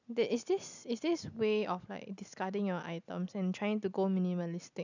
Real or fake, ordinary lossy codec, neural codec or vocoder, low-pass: real; none; none; 7.2 kHz